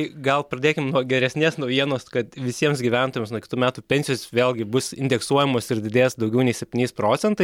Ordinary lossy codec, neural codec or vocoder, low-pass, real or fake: MP3, 96 kbps; none; 19.8 kHz; real